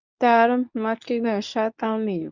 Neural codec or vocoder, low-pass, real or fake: codec, 24 kHz, 0.9 kbps, WavTokenizer, medium speech release version 2; 7.2 kHz; fake